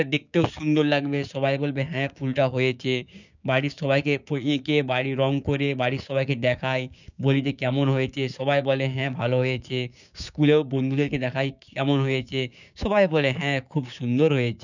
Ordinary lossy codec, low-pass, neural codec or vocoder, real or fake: none; 7.2 kHz; codec, 16 kHz, 6 kbps, DAC; fake